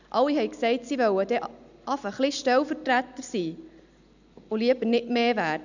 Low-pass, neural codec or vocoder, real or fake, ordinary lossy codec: 7.2 kHz; none; real; none